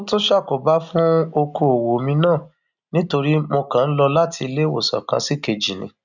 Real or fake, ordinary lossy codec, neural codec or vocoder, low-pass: real; none; none; 7.2 kHz